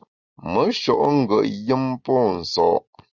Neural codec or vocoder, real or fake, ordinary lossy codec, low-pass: none; real; Opus, 64 kbps; 7.2 kHz